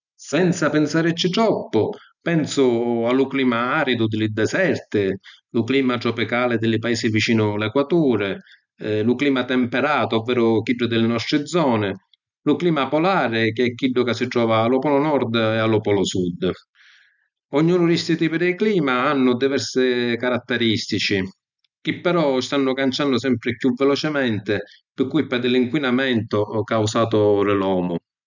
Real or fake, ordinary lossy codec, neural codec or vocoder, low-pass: real; none; none; 7.2 kHz